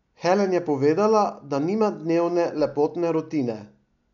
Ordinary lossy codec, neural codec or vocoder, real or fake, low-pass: none; none; real; 7.2 kHz